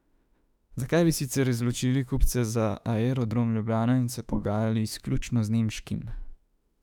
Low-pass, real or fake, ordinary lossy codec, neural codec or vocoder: 19.8 kHz; fake; none; autoencoder, 48 kHz, 32 numbers a frame, DAC-VAE, trained on Japanese speech